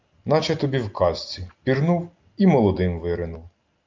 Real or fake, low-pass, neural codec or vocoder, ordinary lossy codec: real; 7.2 kHz; none; Opus, 24 kbps